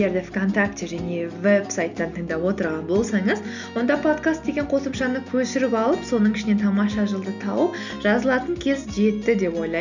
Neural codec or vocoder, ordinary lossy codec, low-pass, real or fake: none; none; 7.2 kHz; real